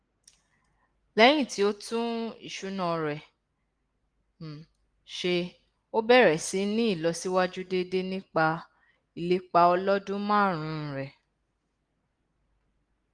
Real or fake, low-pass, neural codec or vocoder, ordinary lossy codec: real; 9.9 kHz; none; Opus, 24 kbps